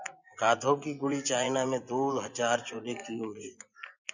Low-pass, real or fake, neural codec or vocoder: 7.2 kHz; fake; vocoder, 24 kHz, 100 mel bands, Vocos